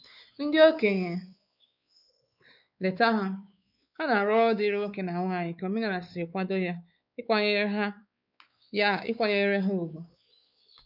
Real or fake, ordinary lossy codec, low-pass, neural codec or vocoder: fake; none; 5.4 kHz; codec, 16 kHz, 4 kbps, X-Codec, WavLM features, trained on Multilingual LibriSpeech